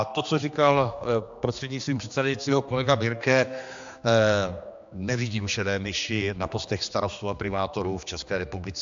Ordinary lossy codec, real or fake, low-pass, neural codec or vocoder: MP3, 64 kbps; fake; 7.2 kHz; codec, 16 kHz, 2 kbps, X-Codec, HuBERT features, trained on general audio